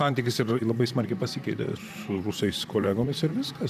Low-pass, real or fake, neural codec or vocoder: 14.4 kHz; fake; vocoder, 44.1 kHz, 128 mel bands, Pupu-Vocoder